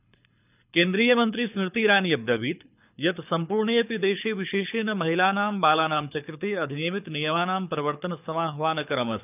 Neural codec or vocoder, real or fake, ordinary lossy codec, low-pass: codec, 24 kHz, 6 kbps, HILCodec; fake; none; 3.6 kHz